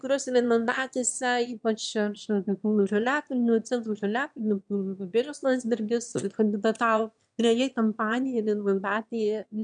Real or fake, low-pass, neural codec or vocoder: fake; 9.9 kHz; autoencoder, 22.05 kHz, a latent of 192 numbers a frame, VITS, trained on one speaker